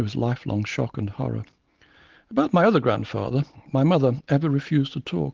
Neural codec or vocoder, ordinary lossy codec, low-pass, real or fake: none; Opus, 16 kbps; 7.2 kHz; real